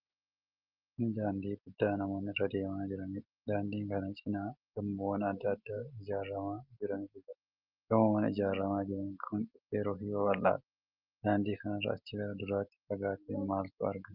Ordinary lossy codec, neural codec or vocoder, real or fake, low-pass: Opus, 24 kbps; none; real; 5.4 kHz